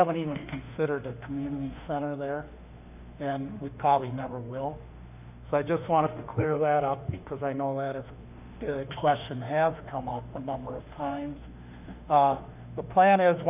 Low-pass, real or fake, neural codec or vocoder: 3.6 kHz; fake; autoencoder, 48 kHz, 32 numbers a frame, DAC-VAE, trained on Japanese speech